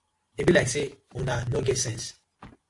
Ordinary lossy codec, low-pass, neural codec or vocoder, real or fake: AAC, 48 kbps; 10.8 kHz; none; real